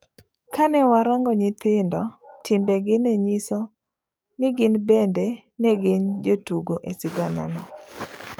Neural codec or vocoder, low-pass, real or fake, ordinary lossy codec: codec, 44.1 kHz, 7.8 kbps, DAC; none; fake; none